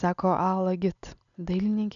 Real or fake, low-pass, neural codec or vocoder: real; 7.2 kHz; none